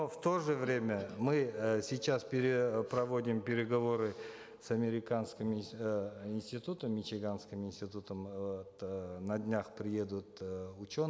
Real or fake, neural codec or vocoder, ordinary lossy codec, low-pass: real; none; none; none